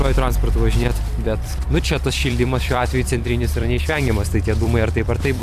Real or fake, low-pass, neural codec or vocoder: fake; 14.4 kHz; vocoder, 44.1 kHz, 128 mel bands every 256 samples, BigVGAN v2